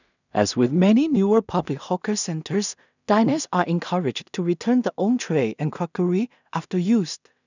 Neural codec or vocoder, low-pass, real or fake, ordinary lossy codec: codec, 16 kHz in and 24 kHz out, 0.4 kbps, LongCat-Audio-Codec, two codebook decoder; 7.2 kHz; fake; none